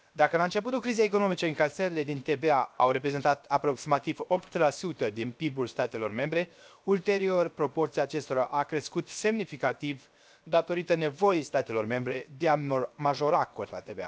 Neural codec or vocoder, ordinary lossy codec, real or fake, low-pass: codec, 16 kHz, 0.7 kbps, FocalCodec; none; fake; none